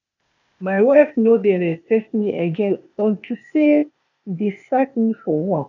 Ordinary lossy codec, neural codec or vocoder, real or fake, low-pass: none; codec, 16 kHz, 0.8 kbps, ZipCodec; fake; 7.2 kHz